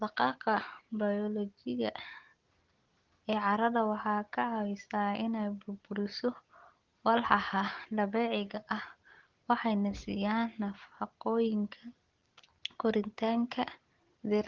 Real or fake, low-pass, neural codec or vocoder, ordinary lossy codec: real; 7.2 kHz; none; Opus, 16 kbps